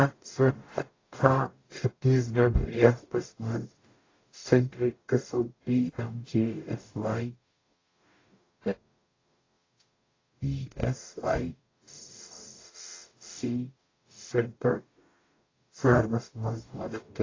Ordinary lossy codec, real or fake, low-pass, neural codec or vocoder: AAC, 32 kbps; fake; 7.2 kHz; codec, 44.1 kHz, 0.9 kbps, DAC